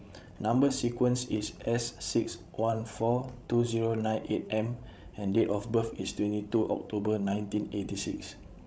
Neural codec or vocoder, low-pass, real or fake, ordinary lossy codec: codec, 16 kHz, 16 kbps, FreqCodec, larger model; none; fake; none